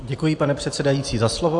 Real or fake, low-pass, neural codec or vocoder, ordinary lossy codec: real; 10.8 kHz; none; AAC, 64 kbps